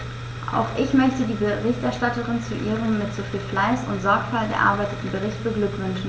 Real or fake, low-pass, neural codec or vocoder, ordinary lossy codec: real; none; none; none